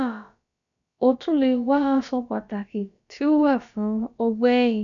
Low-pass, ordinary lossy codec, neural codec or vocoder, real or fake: 7.2 kHz; none; codec, 16 kHz, about 1 kbps, DyCAST, with the encoder's durations; fake